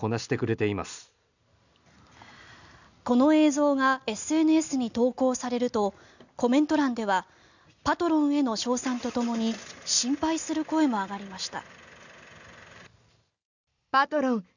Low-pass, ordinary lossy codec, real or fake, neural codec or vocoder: 7.2 kHz; none; real; none